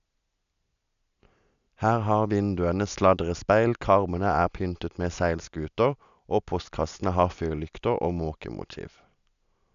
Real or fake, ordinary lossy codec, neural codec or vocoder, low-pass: real; none; none; 7.2 kHz